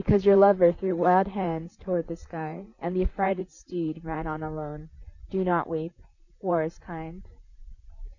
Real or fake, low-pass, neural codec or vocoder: fake; 7.2 kHz; vocoder, 44.1 kHz, 128 mel bands, Pupu-Vocoder